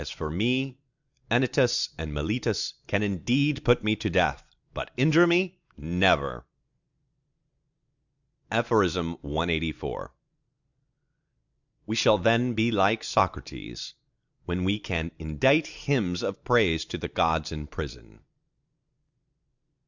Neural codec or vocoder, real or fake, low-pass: none; real; 7.2 kHz